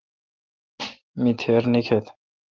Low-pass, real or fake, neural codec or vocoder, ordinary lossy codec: 7.2 kHz; real; none; Opus, 16 kbps